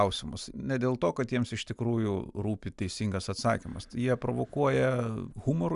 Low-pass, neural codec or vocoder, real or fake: 10.8 kHz; none; real